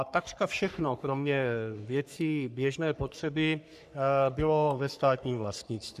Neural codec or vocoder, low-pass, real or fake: codec, 44.1 kHz, 3.4 kbps, Pupu-Codec; 14.4 kHz; fake